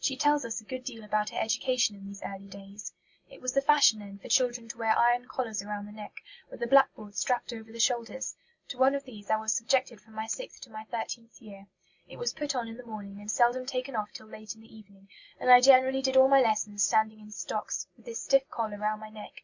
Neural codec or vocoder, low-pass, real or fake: none; 7.2 kHz; real